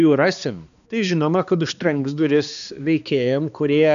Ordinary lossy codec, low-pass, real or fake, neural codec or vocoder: MP3, 96 kbps; 7.2 kHz; fake; codec, 16 kHz, 2 kbps, X-Codec, HuBERT features, trained on balanced general audio